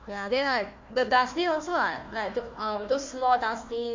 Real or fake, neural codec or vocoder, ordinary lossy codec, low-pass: fake; codec, 16 kHz, 1 kbps, FunCodec, trained on Chinese and English, 50 frames a second; MP3, 48 kbps; 7.2 kHz